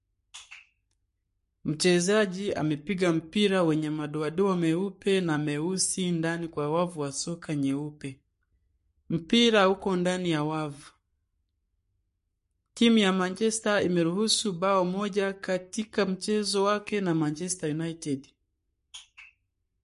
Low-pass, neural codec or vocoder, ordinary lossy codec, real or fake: 14.4 kHz; codec, 44.1 kHz, 7.8 kbps, Pupu-Codec; MP3, 48 kbps; fake